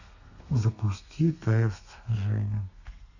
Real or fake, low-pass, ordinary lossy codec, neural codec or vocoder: fake; 7.2 kHz; none; codec, 32 kHz, 1.9 kbps, SNAC